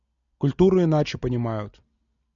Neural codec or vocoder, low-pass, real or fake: none; 7.2 kHz; real